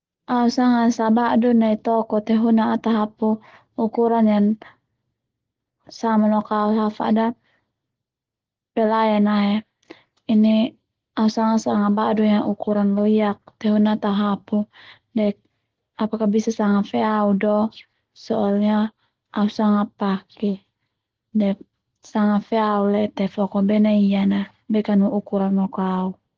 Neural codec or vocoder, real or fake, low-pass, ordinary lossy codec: none; real; 7.2 kHz; Opus, 16 kbps